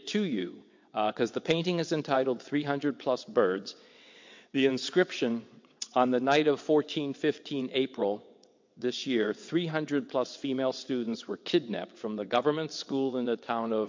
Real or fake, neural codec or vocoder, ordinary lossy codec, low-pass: fake; vocoder, 22.05 kHz, 80 mel bands, WaveNeXt; MP3, 48 kbps; 7.2 kHz